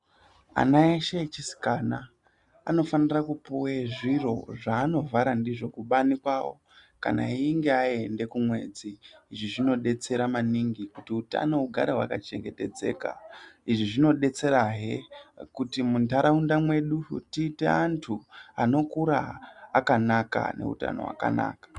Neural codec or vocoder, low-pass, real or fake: none; 10.8 kHz; real